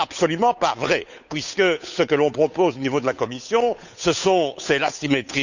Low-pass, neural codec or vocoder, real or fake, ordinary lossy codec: 7.2 kHz; codec, 16 kHz, 8 kbps, FunCodec, trained on Chinese and English, 25 frames a second; fake; none